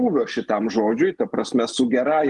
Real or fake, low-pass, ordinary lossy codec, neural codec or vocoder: real; 10.8 kHz; Opus, 24 kbps; none